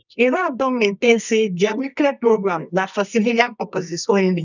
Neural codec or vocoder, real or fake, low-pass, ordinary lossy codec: codec, 24 kHz, 0.9 kbps, WavTokenizer, medium music audio release; fake; 7.2 kHz; none